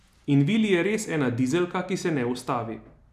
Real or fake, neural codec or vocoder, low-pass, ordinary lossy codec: real; none; 14.4 kHz; none